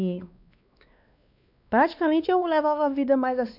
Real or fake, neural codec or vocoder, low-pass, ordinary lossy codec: fake; codec, 16 kHz, 2 kbps, X-Codec, WavLM features, trained on Multilingual LibriSpeech; 5.4 kHz; none